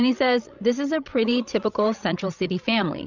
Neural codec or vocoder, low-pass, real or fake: codec, 16 kHz, 16 kbps, FreqCodec, larger model; 7.2 kHz; fake